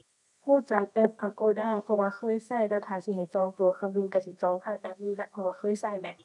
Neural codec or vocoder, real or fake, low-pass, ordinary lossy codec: codec, 24 kHz, 0.9 kbps, WavTokenizer, medium music audio release; fake; 10.8 kHz; none